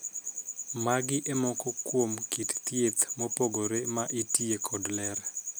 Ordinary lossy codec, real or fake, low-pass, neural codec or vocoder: none; real; none; none